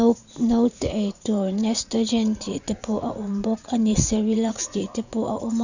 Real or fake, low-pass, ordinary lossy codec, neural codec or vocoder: fake; 7.2 kHz; none; vocoder, 44.1 kHz, 80 mel bands, Vocos